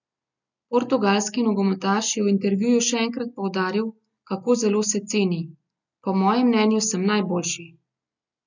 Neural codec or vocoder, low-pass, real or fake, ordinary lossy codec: none; 7.2 kHz; real; none